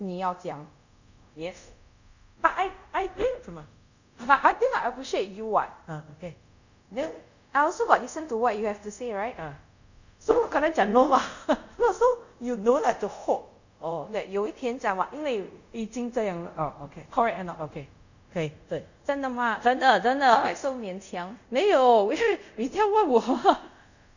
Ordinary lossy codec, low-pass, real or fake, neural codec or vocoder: none; 7.2 kHz; fake; codec, 24 kHz, 0.5 kbps, DualCodec